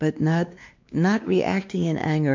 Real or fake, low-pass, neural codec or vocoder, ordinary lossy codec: fake; 7.2 kHz; codec, 16 kHz, 2 kbps, X-Codec, WavLM features, trained on Multilingual LibriSpeech; MP3, 48 kbps